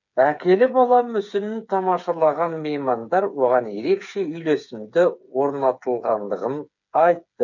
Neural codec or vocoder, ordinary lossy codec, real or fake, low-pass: codec, 16 kHz, 8 kbps, FreqCodec, smaller model; none; fake; 7.2 kHz